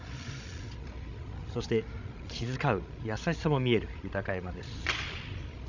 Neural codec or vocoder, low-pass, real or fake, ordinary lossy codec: codec, 16 kHz, 16 kbps, FreqCodec, larger model; 7.2 kHz; fake; none